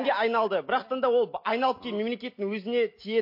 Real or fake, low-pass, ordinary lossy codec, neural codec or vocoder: real; 5.4 kHz; MP3, 32 kbps; none